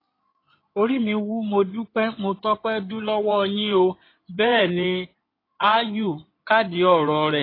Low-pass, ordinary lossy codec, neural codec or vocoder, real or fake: 5.4 kHz; AAC, 24 kbps; codec, 16 kHz in and 24 kHz out, 2.2 kbps, FireRedTTS-2 codec; fake